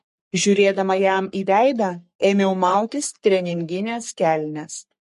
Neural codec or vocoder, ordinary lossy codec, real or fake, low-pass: codec, 44.1 kHz, 3.4 kbps, Pupu-Codec; MP3, 48 kbps; fake; 14.4 kHz